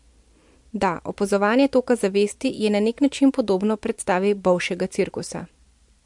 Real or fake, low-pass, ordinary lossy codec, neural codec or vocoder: real; 10.8 kHz; MP3, 64 kbps; none